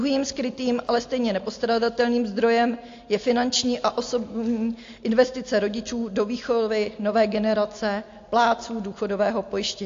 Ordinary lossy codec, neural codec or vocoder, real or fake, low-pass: AAC, 48 kbps; none; real; 7.2 kHz